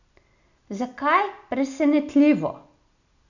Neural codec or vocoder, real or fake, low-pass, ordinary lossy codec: none; real; 7.2 kHz; none